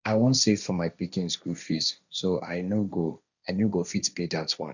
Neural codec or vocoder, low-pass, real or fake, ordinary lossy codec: codec, 16 kHz, 1.1 kbps, Voila-Tokenizer; 7.2 kHz; fake; none